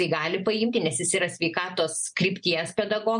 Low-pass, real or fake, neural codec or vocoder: 9.9 kHz; real; none